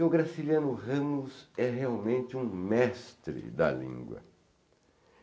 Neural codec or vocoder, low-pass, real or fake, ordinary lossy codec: none; none; real; none